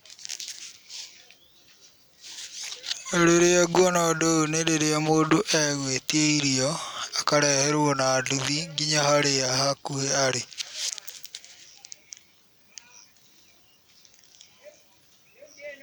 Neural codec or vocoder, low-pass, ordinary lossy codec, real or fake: none; none; none; real